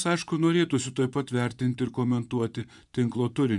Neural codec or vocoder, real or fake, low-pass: vocoder, 24 kHz, 100 mel bands, Vocos; fake; 10.8 kHz